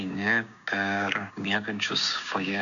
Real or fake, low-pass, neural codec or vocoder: fake; 7.2 kHz; codec, 16 kHz, 6 kbps, DAC